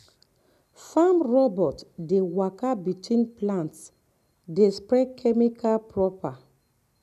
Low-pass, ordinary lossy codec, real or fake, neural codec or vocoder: 14.4 kHz; none; real; none